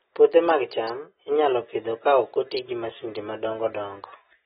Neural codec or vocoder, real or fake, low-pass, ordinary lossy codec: none; real; 7.2 kHz; AAC, 16 kbps